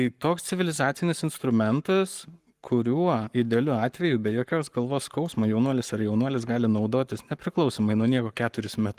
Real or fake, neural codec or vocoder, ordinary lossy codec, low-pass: fake; codec, 44.1 kHz, 7.8 kbps, Pupu-Codec; Opus, 24 kbps; 14.4 kHz